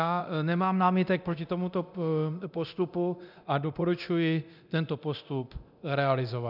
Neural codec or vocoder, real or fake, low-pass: codec, 24 kHz, 0.9 kbps, DualCodec; fake; 5.4 kHz